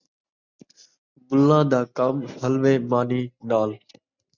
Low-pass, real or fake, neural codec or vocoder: 7.2 kHz; real; none